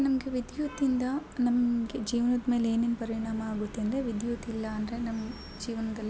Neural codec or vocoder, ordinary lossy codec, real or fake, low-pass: none; none; real; none